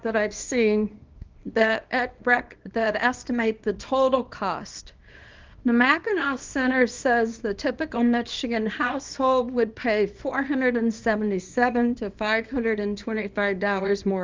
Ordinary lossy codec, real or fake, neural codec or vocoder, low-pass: Opus, 32 kbps; fake; codec, 24 kHz, 0.9 kbps, WavTokenizer, small release; 7.2 kHz